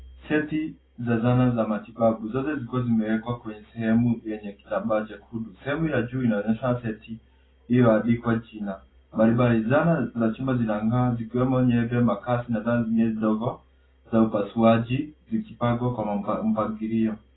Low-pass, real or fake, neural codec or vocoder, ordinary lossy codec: 7.2 kHz; real; none; AAC, 16 kbps